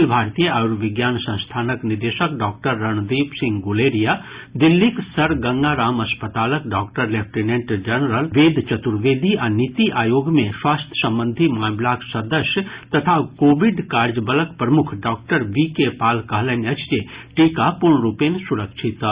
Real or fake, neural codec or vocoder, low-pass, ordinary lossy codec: real; none; 3.6 kHz; Opus, 64 kbps